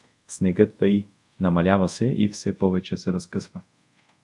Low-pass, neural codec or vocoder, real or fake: 10.8 kHz; codec, 24 kHz, 0.5 kbps, DualCodec; fake